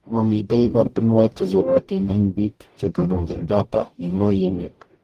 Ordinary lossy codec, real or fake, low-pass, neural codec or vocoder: Opus, 24 kbps; fake; 14.4 kHz; codec, 44.1 kHz, 0.9 kbps, DAC